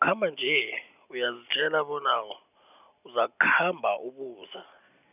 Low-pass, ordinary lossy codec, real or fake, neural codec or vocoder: 3.6 kHz; none; real; none